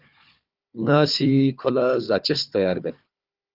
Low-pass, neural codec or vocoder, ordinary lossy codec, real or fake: 5.4 kHz; codec, 16 kHz, 4 kbps, FunCodec, trained on Chinese and English, 50 frames a second; Opus, 32 kbps; fake